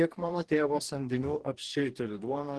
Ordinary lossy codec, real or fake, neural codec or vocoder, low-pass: Opus, 16 kbps; fake; codec, 44.1 kHz, 2.6 kbps, DAC; 10.8 kHz